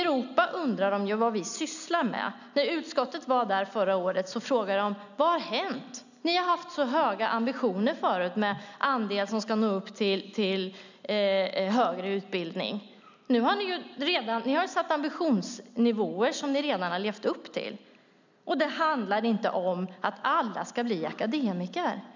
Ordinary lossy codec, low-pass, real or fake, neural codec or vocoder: none; 7.2 kHz; real; none